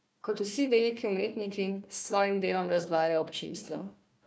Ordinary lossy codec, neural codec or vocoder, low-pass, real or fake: none; codec, 16 kHz, 1 kbps, FunCodec, trained on Chinese and English, 50 frames a second; none; fake